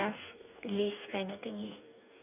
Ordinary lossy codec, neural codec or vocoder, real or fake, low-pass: none; codec, 44.1 kHz, 2.6 kbps, DAC; fake; 3.6 kHz